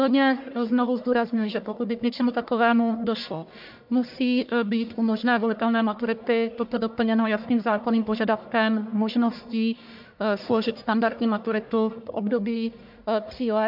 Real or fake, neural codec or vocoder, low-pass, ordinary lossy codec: fake; codec, 44.1 kHz, 1.7 kbps, Pupu-Codec; 5.4 kHz; MP3, 48 kbps